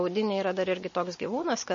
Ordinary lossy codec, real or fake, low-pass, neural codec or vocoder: MP3, 32 kbps; real; 7.2 kHz; none